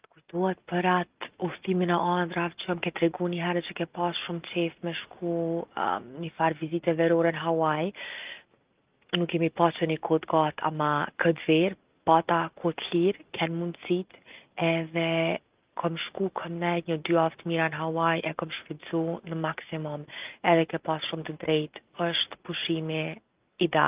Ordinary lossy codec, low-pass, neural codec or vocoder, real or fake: Opus, 32 kbps; 3.6 kHz; none; real